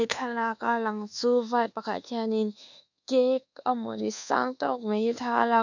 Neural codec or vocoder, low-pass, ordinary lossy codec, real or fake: codec, 24 kHz, 1.2 kbps, DualCodec; 7.2 kHz; none; fake